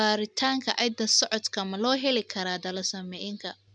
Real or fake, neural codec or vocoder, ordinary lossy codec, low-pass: real; none; none; 9.9 kHz